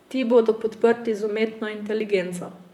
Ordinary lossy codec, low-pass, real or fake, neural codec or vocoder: MP3, 96 kbps; 19.8 kHz; fake; vocoder, 44.1 kHz, 128 mel bands, Pupu-Vocoder